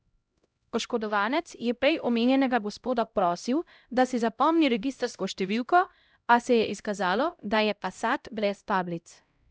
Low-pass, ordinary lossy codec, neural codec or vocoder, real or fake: none; none; codec, 16 kHz, 0.5 kbps, X-Codec, HuBERT features, trained on LibriSpeech; fake